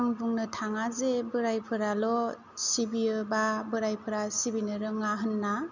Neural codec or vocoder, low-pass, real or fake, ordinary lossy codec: none; 7.2 kHz; real; none